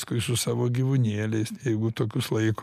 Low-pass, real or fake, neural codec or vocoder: 14.4 kHz; real; none